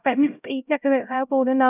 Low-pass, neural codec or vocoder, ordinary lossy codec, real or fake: 3.6 kHz; codec, 16 kHz, 0.5 kbps, X-Codec, HuBERT features, trained on LibriSpeech; none; fake